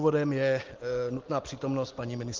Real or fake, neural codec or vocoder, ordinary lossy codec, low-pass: real; none; Opus, 16 kbps; 7.2 kHz